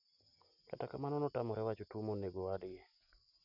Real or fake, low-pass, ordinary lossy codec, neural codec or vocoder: real; 5.4 kHz; none; none